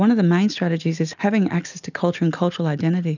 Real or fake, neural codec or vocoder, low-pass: real; none; 7.2 kHz